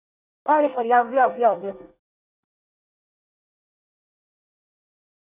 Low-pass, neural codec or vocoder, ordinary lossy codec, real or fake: 3.6 kHz; codec, 24 kHz, 1 kbps, SNAC; none; fake